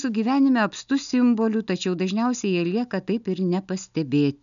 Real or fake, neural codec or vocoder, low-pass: real; none; 7.2 kHz